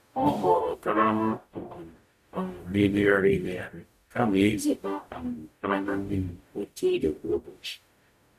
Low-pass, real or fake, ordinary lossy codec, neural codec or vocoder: 14.4 kHz; fake; none; codec, 44.1 kHz, 0.9 kbps, DAC